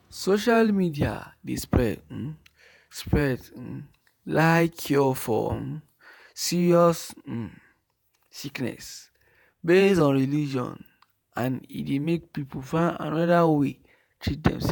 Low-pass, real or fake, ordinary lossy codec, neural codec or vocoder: none; fake; none; vocoder, 48 kHz, 128 mel bands, Vocos